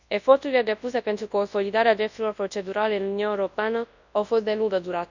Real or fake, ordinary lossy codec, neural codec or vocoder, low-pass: fake; none; codec, 24 kHz, 0.9 kbps, WavTokenizer, large speech release; 7.2 kHz